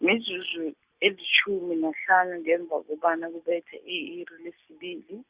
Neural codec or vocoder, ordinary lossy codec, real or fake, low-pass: none; Opus, 64 kbps; real; 3.6 kHz